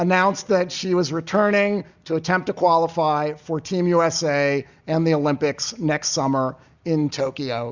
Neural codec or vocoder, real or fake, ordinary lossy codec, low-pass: none; real; Opus, 64 kbps; 7.2 kHz